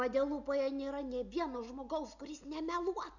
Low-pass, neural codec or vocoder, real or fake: 7.2 kHz; none; real